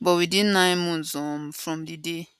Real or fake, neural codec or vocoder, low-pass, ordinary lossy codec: real; none; 14.4 kHz; none